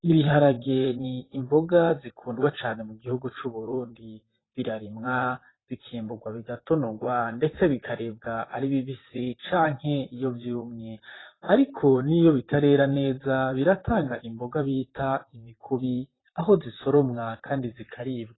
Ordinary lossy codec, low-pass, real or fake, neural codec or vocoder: AAC, 16 kbps; 7.2 kHz; fake; vocoder, 44.1 kHz, 128 mel bands, Pupu-Vocoder